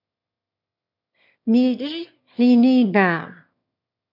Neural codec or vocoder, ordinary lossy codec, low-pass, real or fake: autoencoder, 22.05 kHz, a latent of 192 numbers a frame, VITS, trained on one speaker; MP3, 48 kbps; 5.4 kHz; fake